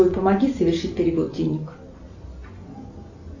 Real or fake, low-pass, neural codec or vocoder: real; 7.2 kHz; none